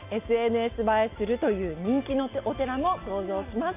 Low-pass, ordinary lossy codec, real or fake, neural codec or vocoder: 3.6 kHz; MP3, 32 kbps; real; none